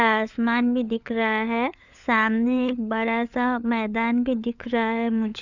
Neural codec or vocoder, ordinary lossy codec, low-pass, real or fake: codec, 16 kHz, 4 kbps, FunCodec, trained on LibriTTS, 50 frames a second; none; 7.2 kHz; fake